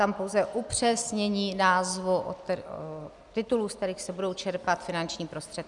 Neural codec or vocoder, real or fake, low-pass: none; real; 10.8 kHz